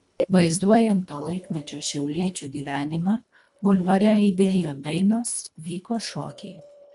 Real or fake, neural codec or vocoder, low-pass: fake; codec, 24 kHz, 1.5 kbps, HILCodec; 10.8 kHz